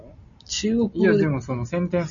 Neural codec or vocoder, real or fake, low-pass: none; real; 7.2 kHz